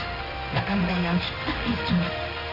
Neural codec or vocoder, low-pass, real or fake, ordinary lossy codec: codec, 16 kHz in and 24 kHz out, 1 kbps, XY-Tokenizer; 5.4 kHz; fake; none